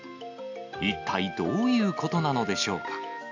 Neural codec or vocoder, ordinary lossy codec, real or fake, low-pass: none; AAC, 48 kbps; real; 7.2 kHz